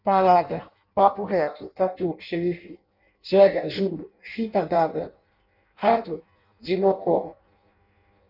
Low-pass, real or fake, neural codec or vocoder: 5.4 kHz; fake; codec, 16 kHz in and 24 kHz out, 0.6 kbps, FireRedTTS-2 codec